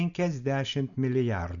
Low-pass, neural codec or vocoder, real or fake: 7.2 kHz; none; real